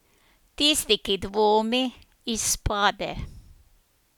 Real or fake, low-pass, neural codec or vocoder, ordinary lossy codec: real; 19.8 kHz; none; none